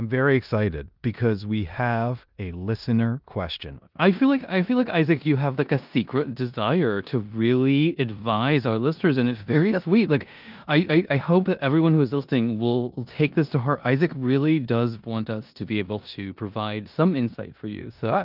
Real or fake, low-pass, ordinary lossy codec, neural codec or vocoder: fake; 5.4 kHz; Opus, 24 kbps; codec, 16 kHz in and 24 kHz out, 0.9 kbps, LongCat-Audio-Codec, four codebook decoder